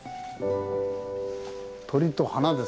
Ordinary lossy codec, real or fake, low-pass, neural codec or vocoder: none; real; none; none